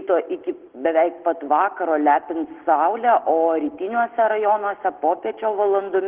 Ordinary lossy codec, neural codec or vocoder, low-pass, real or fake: Opus, 16 kbps; none; 3.6 kHz; real